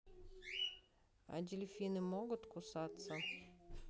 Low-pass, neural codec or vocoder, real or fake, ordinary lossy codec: none; none; real; none